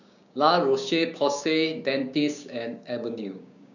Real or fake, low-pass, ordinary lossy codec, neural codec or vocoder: fake; 7.2 kHz; none; codec, 16 kHz, 6 kbps, DAC